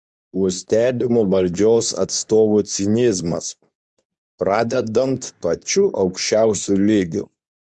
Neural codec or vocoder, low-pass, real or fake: codec, 24 kHz, 0.9 kbps, WavTokenizer, medium speech release version 2; 10.8 kHz; fake